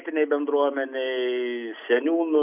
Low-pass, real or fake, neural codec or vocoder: 3.6 kHz; real; none